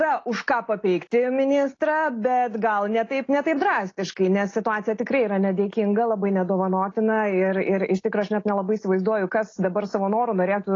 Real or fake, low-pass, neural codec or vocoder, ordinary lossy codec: real; 7.2 kHz; none; AAC, 32 kbps